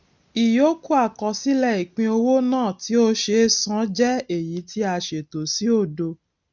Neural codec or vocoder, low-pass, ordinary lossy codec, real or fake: none; 7.2 kHz; none; real